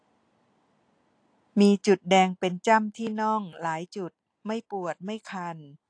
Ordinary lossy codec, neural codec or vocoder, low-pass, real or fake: AAC, 48 kbps; none; 9.9 kHz; real